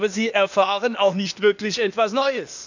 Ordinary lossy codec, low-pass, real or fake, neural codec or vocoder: none; 7.2 kHz; fake; codec, 16 kHz, 0.8 kbps, ZipCodec